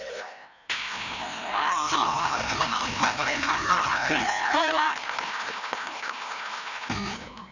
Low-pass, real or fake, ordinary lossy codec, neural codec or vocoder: 7.2 kHz; fake; none; codec, 16 kHz, 1 kbps, FreqCodec, larger model